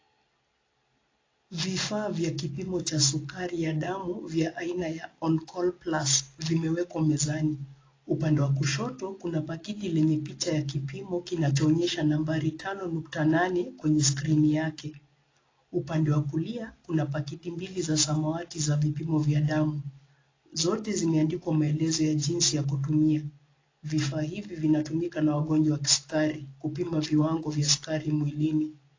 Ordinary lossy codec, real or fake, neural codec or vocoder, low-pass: AAC, 32 kbps; real; none; 7.2 kHz